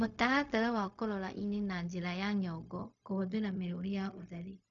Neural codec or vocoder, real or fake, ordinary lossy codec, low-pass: codec, 16 kHz, 0.4 kbps, LongCat-Audio-Codec; fake; none; 7.2 kHz